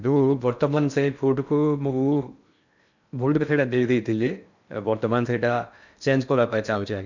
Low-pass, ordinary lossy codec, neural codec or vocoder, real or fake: 7.2 kHz; none; codec, 16 kHz in and 24 kHz out, 0.6 kbps, FocalCodec, streaming, 2048 codes; fake